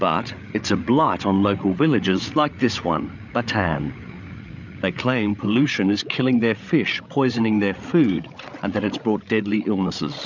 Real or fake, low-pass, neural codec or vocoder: fake; 7.2 kHz; codec, 16 kHz, 8 kbps, FreqCodec, larger model